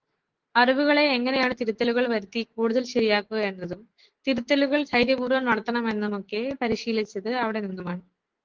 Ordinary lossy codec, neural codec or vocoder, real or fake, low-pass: Opus, 16 kbps; autoencoder, 48 kHz, 128 numbers a frame, DAC-VAE, trained on Japanese speech; fake; 7.2 kHz